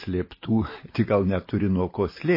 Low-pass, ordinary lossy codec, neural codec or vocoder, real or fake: 5.4 kHz; MP3, 24 kbps; none; real